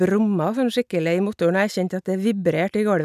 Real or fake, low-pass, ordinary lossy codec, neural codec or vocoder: real; 14.4 kHz; none; none